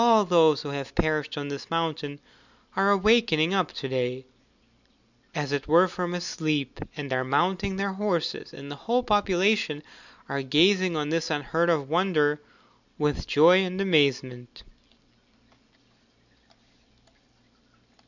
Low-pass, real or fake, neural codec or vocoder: 7.2 kHz; real; none